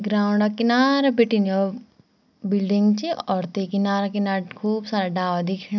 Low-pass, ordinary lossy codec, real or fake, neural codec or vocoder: 7.2 kHz; none; real; none